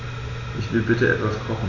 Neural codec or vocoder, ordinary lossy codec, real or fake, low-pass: none; none; real; 7.2 kHz